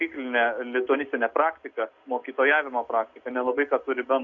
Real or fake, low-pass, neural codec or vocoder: real; 7.2 kHz; none